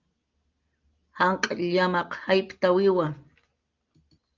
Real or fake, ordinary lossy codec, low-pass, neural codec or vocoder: real; Opus, 24 kbps; 7.2 kHz; none